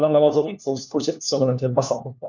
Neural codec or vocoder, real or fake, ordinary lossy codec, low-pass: codec, 16 kHz, 1 kbps, FunCodec, trained on LibriTTS, 50 frames a second; fake; none; 7.2 kHz